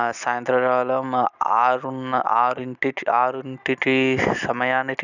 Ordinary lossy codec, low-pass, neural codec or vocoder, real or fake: none; 7.2 kHz; none; real